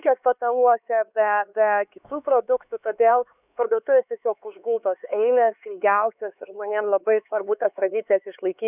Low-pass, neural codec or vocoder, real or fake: 3.6 kHz; codec, 16 kHz, 4 kbps, X-Codec, HuBERT features, trained on LibriSpeech; fake